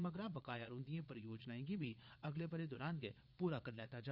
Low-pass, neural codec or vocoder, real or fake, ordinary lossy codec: 5.4 kHz; vocoder, 22.05 kHz, 80 mel bands, Vocos; fake; none